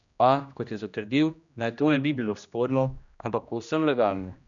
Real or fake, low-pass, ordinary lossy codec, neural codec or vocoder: fake; 7.2 kHz; none; codec, 16 kHz, 1 kbps, X-Codec, HuBERT features, trained on general audio